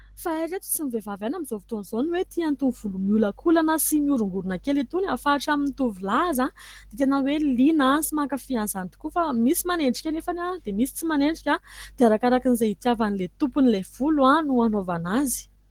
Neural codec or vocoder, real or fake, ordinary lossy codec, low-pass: none; real; Opus, 16 kbps; 19.8 kHz